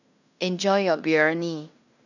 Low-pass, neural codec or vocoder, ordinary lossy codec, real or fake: 7.2 kHz; codec, 16 kHz in and 24 kHz out, 0.9 kbps, LongCat-Audio-Codec, fine tuned four codebook decoder; none; fake